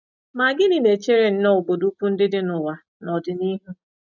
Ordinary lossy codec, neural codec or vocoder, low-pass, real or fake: none; none; 7.2 kHz; real